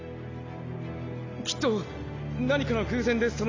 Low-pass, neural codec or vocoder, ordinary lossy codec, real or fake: 7.2 kHz; none; AAC, 32 kbps; real